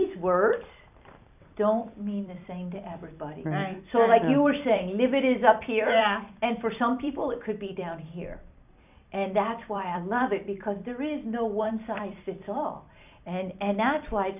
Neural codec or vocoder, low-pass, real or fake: vocoder, 44.1 kHz, 128 mel bands every 256 samples, BigVGAN v2; 3.6 kHz; fake